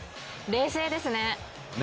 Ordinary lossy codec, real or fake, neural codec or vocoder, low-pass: none; real; none; none